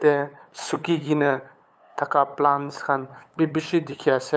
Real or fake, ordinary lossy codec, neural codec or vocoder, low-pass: fake; none; codec, 16 kHz, 8 kbps, FunCodec, trained on LibriTTS, 25 frames a second; none